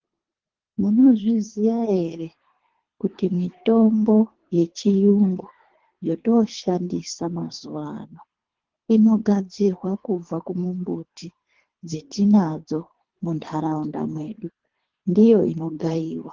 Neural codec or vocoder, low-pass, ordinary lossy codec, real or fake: codec, 24 kHz, 3 kbps, HILCodec; 7.2 kHz; Opus, 16 kbps; fake